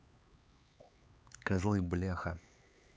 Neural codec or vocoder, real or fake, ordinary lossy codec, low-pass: codec, 16 kHz, 4 kbps, X-Codec, HuBERT features, trained on LibriSpeech; fake; none; none